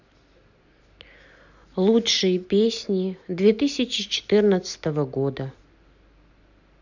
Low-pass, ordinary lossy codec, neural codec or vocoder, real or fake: 7.2 kHz; none; none; real